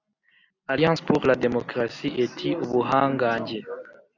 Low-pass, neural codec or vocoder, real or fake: 7.2 kHz; none; real